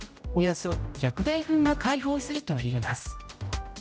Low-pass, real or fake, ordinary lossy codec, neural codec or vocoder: none; fake; none; codec, 16 kHz, 0.5 kbps, X-Codec, HuBERT features, trained on general audio